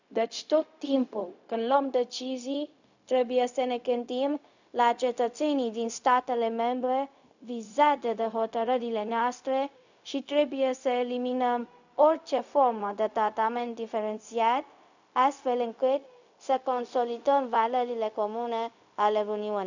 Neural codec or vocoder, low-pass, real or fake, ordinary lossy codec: codec, 16 kHz, 0.4 kbps, LongCat-Audio-Codec; 7.2 kHz; fake; none